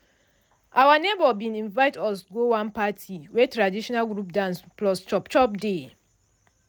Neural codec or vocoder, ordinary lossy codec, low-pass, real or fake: none; none; none; real